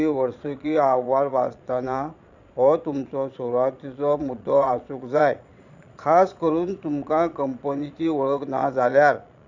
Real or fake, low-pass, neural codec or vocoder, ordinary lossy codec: fake; 7.2 kHz; vocoder, 22.05 kHz, 80 mel bands, Vocos; none